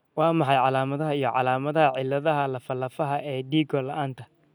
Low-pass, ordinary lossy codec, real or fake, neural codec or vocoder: 19.8 kHz; none; real; none